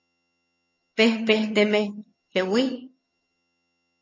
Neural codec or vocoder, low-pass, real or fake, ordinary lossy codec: vocoder, 22.05 kHz, 80 mel bands, HiFi-GAN; 7.2 kHz; fake; MP3, 32 kbps